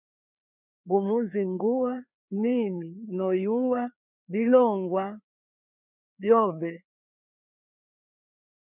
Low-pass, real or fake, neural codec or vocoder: 3.6 kHz; fake; codec, 16 kHz, 2 kbps, FreqCodec, larger model